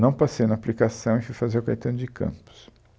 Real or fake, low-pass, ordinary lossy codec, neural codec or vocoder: real; none; none; none